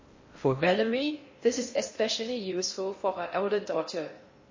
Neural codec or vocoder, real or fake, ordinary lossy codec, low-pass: codec, 16 kHz in and 24 kHz out, 0.6 kbps, FocalCodec, streaming, 4096 codes; fake; MP3, 32 kbps; 7.2 kHz